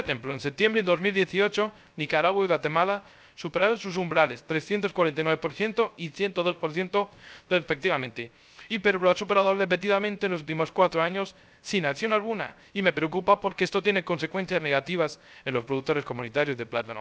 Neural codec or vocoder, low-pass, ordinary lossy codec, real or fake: codec, 16 kHz, 0.3 kbps, FocalCodec; none; none; fake